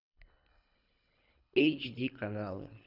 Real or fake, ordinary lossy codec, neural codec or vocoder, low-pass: fake; none; codec, 24 kHz, 3 kbps, HILCodec; 5.4 kHz